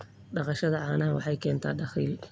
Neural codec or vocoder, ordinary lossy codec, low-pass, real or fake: none; none; none; real